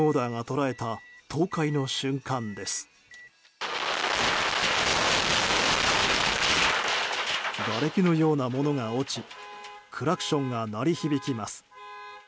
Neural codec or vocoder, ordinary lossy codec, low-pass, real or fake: none; none; none; real